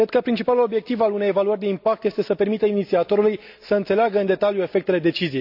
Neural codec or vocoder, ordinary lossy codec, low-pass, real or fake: none; AAC, 48 kbps; 5.4 kHz; real